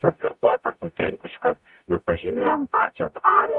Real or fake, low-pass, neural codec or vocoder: fake; 10.8 kHz; codec, 44.1 kHz, 0.9 kbps, DAC